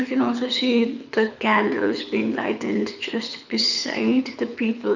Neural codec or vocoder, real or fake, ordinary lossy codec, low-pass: codec, 24 kHz, 6 kbps, HILCodec; fake; none; 7.2 kHz